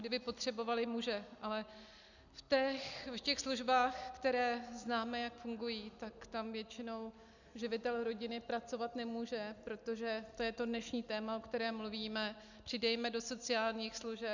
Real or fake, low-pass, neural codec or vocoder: real; 7.2 kHz; none